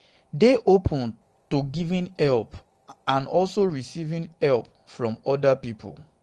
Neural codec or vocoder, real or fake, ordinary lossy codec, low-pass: vocoder, 24 kHz, 100 mel bands, Vocos; fake; Opus, 24 kbps; 10.8 kHz